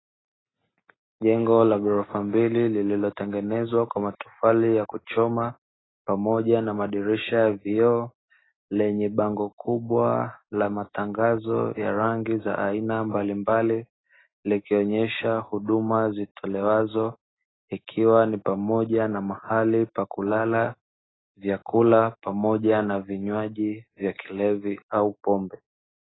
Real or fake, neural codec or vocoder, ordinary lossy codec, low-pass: real; none; AAC, 16 kbps; 7.2 kHz